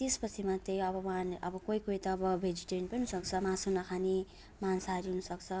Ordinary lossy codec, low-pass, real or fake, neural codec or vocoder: none; none; real; none